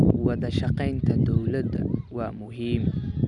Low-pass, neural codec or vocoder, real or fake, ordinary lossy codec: 10.8 kHz; none; real; none